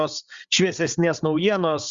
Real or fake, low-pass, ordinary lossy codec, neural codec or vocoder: fake; 7.2 kHz; Opus, 64 kbps; codec, 16 kHz, 8 kbps, FreqCodec, larger model